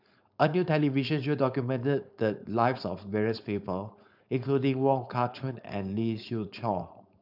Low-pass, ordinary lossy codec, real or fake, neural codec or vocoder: 5.4 kHz; none; fake; codec, 16 kHz, 4.8 kbps, FACodec